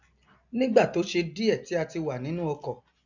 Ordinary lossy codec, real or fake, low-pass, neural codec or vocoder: none; real; 7.2 kHz; none